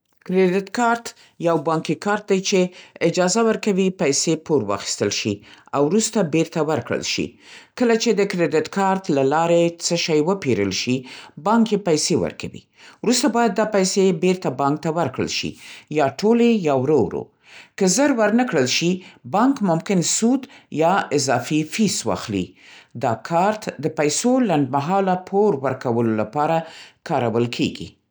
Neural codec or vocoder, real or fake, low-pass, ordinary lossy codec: none; real; none; none